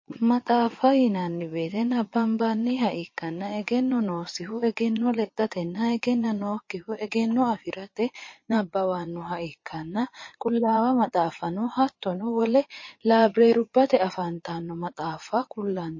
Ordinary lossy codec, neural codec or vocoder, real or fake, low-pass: MP3, 32 kbps; vocoder, 22.05 kHz, 80 mel bands, Vocos; fake; 7.2 kHz